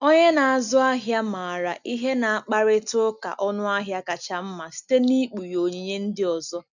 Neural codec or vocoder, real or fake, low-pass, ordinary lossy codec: none; real; 7.2 kHz; none